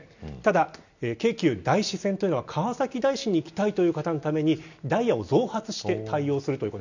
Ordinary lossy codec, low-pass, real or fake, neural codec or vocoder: none; 7.2 kHz; real; none